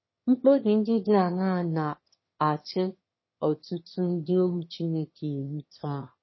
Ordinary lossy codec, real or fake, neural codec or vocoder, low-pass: MP3, 24 kbps; fake; autoencoder, 22.05 kHz, a latent of 192 numbers a frame, VITS, trained on one speaker; 7.2 kHz